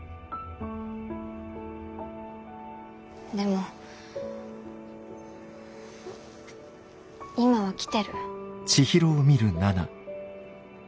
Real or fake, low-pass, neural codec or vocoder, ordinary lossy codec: real; none; none; none